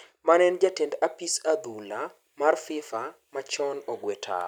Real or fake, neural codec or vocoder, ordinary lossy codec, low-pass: real; none; none; none